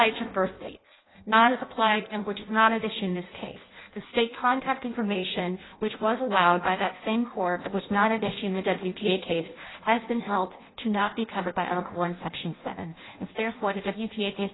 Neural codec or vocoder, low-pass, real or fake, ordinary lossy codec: codec, 16 kHz in and 24 kHz out, 0.6 kbps, FireRedTTS-2 codec; 7.2 kHz; fake; AAC, 16 kbps